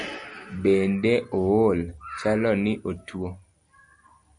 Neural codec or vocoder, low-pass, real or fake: none; 9.9 kHz; real